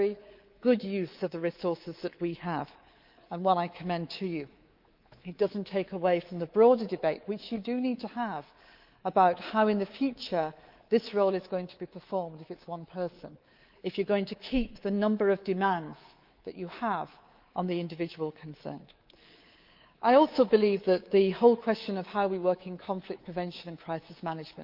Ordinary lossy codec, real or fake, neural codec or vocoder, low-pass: Opus, 24 kbps; fake; codec, 24 kHz, 3.1 kbps, DualCodec; 5.4 kHz